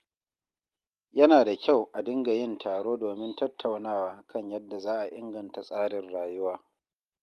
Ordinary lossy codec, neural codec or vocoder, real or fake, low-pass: Opus, 32 kbps; none; real; 14.4 kHz